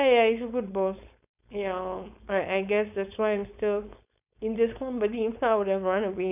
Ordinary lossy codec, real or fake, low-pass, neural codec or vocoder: none; fake; 3.6 kHz; codec, 16 kHz, 4.8 kbps, FACodec